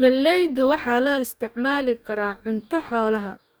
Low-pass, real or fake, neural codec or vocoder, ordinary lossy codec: none; fake; codec, 44.1 kHz, 2.6 kbps, DAC; none